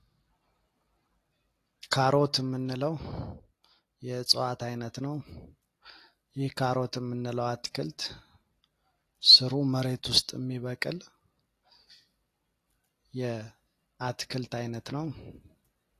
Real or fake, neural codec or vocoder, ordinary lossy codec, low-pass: real; none; AAC, 48 kbps; 14.4 kHz